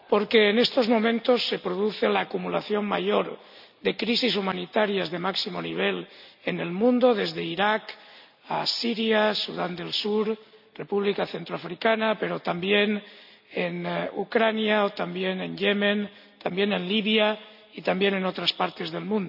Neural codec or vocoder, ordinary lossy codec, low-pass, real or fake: none; none; 5.4 kHz; real